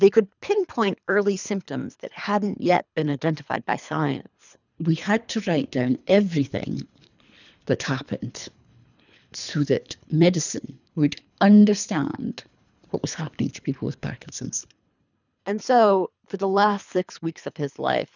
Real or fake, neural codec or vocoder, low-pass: fake; codec, 24 kHz, 3 kbps, HILCodec; 7.2 kHz